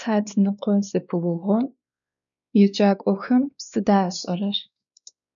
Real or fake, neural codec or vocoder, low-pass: fake; codec, 16 kHz, 2 kbps, X-Codec, WavLM features, trained on Multilingual LibriSpeech; 7.2 kHz